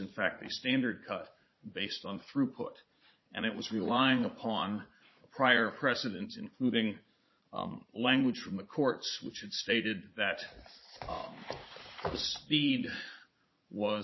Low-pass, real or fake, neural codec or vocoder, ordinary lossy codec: 7.2 kHz; fake; vocoder, 44.1 kHz, 80 mel bands, Vocos; MP3, 24 kbps